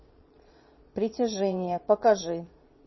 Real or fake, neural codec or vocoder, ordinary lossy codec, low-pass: fake; vocoder, 22.05 kHz, 80 mel bands, Vocos; MP3, 24 kbps; 7.2 kHz